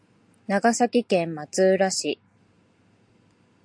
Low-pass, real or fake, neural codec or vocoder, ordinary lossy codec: 9.9 kHz; real; none; AAC, 64 kbps